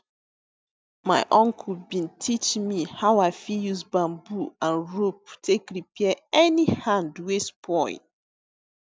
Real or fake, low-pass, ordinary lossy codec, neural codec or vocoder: real; none; none; none